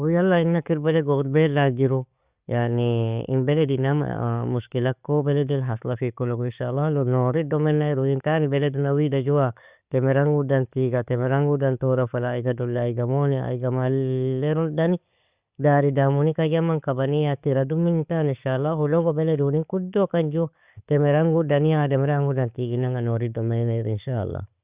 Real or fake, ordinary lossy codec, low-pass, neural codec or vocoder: real; Opus, 64 kbps; 3.6 kHz; none